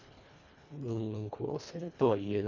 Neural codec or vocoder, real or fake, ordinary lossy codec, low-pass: codec, 24 kHz, 1.5 kbps, HILCodec; fake; Opus, 64 kbps; 7.2 kHz